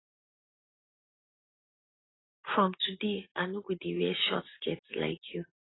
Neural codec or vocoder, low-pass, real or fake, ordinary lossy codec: none; 7.2 kHz; real; AAC, 16 kbps